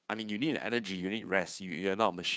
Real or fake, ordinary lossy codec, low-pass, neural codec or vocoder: fake; none; none; codec, 16 kHz, 2 kbps, FunCodec, trained on Chinese and English, 25 frames a second